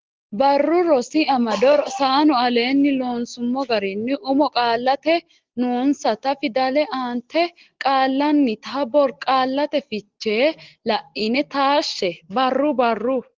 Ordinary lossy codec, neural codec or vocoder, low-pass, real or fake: Opus, 16 kbps; none; 7.2 kHz; real